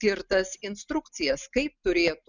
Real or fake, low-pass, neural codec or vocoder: real; 7.2 kHz; none